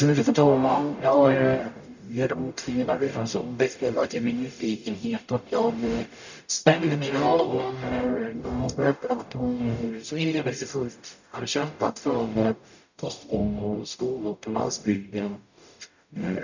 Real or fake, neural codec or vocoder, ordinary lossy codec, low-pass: fake; codec, 44.1 kHz, 0.9 kbps, DAC; none; 7.2 kHz